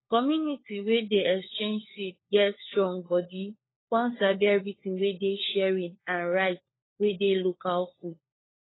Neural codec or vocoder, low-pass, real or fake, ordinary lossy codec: codec, 16 kHz, 4 kbps, FunCodec, trained on LibriTTS, 50 frames a second; 7.2 kHz; fake; AAC, 16 kbps